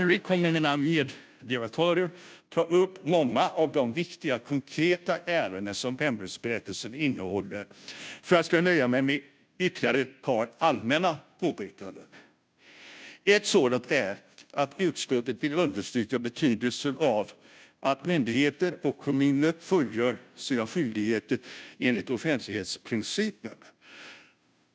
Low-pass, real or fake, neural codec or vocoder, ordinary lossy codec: none; fake; codec, 16 kHz, 0.5 kbps, FunCodec, trained on Chinese and English, 25 frames a second; none